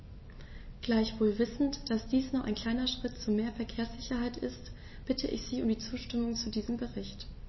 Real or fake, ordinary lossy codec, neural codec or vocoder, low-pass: real; MP3, 24 kbps; none; 7.2 kHz